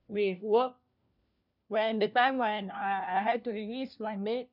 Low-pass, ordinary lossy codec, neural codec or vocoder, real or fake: 5.4 kHz; none; codec, 16 kHz, 1 kbps, FunCodec, trained on LibriTTS, 50 frames a second; fake